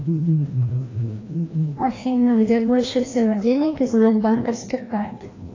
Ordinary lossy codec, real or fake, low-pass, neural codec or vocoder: AAC, 32 kbps; fake; 7.2 kHz; codec, 16 kHz, 1 kbps, FreqCodec, larger model